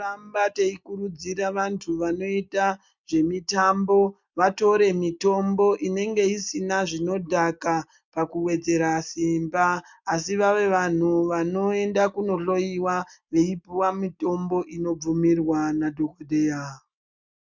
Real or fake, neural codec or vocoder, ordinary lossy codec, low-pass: real; none; MP3, 64 kbps; 7.2 kHz